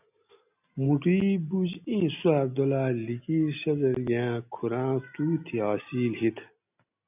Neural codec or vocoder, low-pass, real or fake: none; 3.6 kHz; real